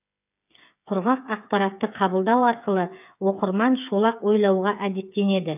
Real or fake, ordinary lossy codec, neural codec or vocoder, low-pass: fake; none; codec, 16 kHz, 8 kbps, FreqCodec, smaller model; 3.6 kHz